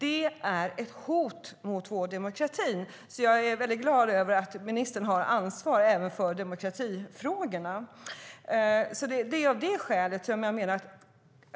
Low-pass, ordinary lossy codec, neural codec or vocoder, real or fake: none; none; none; real